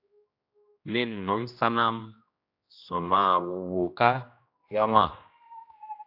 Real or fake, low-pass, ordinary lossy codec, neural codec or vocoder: fake; 5.4 kHz; AAC, 48 kbps; codec, 16 kHz, 1 kbps, X-Codec, HuBERT features, trained on general audio